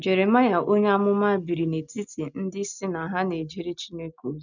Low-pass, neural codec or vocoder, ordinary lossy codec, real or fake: 7.2 kHz; none; none; real